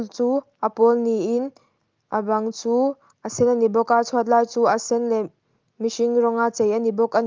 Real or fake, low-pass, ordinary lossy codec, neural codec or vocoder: real; 7.2 kHz; Opus, 32 kbps; none